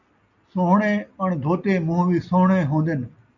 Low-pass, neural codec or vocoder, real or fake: 7.2 kHz; none; real